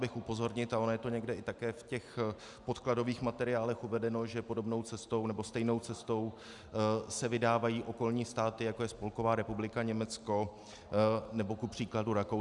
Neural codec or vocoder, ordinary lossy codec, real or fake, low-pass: none; Opus, 64 kbps; real; 10.8 kHz